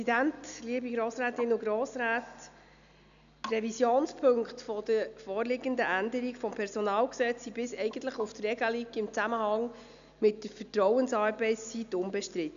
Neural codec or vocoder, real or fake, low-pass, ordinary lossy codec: none; real; 7.2 kHz; none